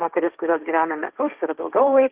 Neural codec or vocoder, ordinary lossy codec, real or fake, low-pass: codec, 16 kHz, 1.1 kbps, Voila-Tokenizer; Opus, 24 kbps; fake; 3.6 kHz